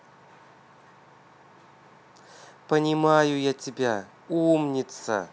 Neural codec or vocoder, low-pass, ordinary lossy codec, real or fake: none; none; none; real